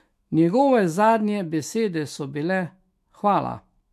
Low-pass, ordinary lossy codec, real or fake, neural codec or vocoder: 14.4 kHz; MP3, 64 kbps; fake; autoencoder, 48 kHz, 128 numbers a frame, DAC-VAE, trained on Japanese speech